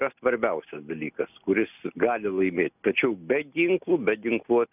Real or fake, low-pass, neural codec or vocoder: real; 3.6 kHz; none